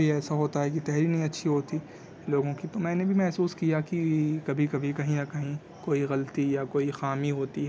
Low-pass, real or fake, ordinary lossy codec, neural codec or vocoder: none; real; none; none